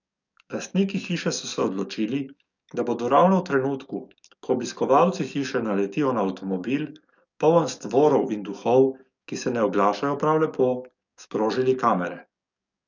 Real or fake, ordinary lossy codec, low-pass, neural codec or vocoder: fake; none; 7.2 kHz; codec, 44.1 kHz, 7.8 kbps, DAC